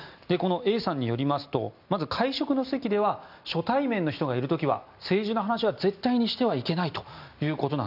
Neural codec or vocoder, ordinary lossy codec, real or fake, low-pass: none; none; real; 5.4 kHz